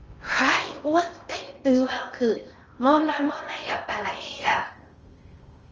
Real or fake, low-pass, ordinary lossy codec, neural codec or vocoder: fake; 7.2 kHz; Opus, 24 kbps; codec, 16 kHz in and 24 kHz out, 0.6 kbps, FocalCodec, streaming, 4096 codes